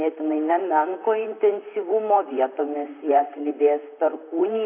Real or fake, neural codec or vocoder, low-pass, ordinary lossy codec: fake; vocoder, 44.1 kHz, 128 mel bands, Pupu-Vocoder; 3.6 kHz; AAC, 24 kbps